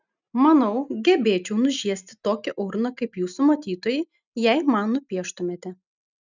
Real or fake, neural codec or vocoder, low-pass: real; none; 7.2 kHz